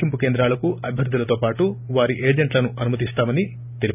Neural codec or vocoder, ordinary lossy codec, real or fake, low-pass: none; none; real; 3.6 kHz